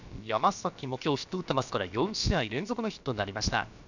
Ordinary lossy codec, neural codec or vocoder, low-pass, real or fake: none; codec, 16 kHz, about 1 kbps, DyCAST, with the encoder's durations; 7.2 kHz; fake